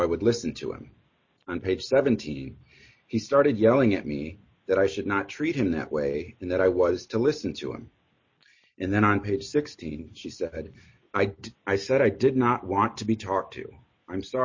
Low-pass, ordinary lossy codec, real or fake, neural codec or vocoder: 7.2 kHz; MP3, 32 kbps; real; none